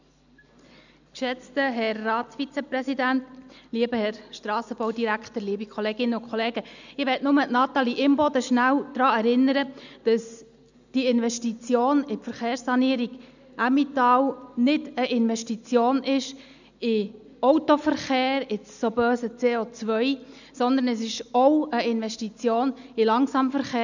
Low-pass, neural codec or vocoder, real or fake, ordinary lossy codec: 7.2 kHz; none; real; none